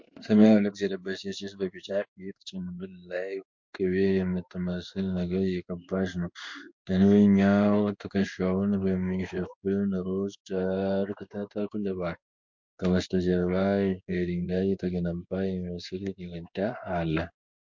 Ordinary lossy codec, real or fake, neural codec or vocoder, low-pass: MP3, 48 kbps; fake; codec, 16 kHz in and 24 kHz out, 1 kbps, XY-Tokenizer; 7.2 kHz